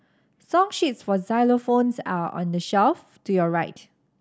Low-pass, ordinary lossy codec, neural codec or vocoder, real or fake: none; none; none; real